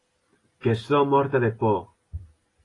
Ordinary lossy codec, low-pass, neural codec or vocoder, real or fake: AAC, 32 kbps; 10.8 kHz; none; real